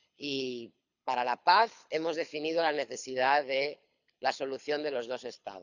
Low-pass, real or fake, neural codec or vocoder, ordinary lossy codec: 7.2 kHz; fake; codec, 24 kHz, 6 kbps, HILCodec; none